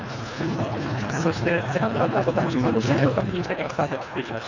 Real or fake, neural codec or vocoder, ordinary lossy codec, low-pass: fake; codec, 24 kHz, 1.5 kbps, HILCodec; none; 7.2 kHz